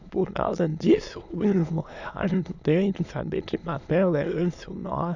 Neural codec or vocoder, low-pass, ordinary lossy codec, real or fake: autoencoder, 22.05 kHz, a latent of 192 numbers a frame, VITS, trained on many speakers; 7.2 kHz; none; fake